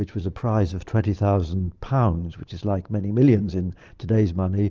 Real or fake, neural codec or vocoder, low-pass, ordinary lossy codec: real; none; 7.2 kHz; Opus, 24 kbps